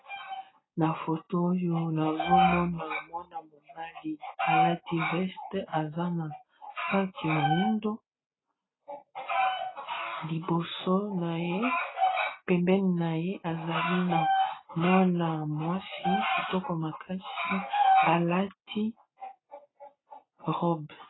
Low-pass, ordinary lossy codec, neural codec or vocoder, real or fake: 7.2 kHz; AAC, 16 kbps; none; real